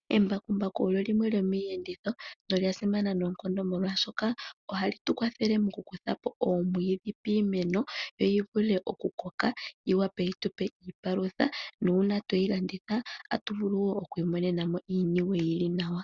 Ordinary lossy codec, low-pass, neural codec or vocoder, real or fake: Opus, 64 kbps; 7.2 kHz; none; real